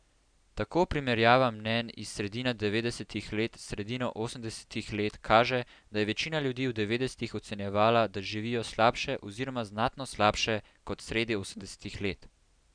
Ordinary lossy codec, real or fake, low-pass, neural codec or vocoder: none; real; 9.9 kHz; none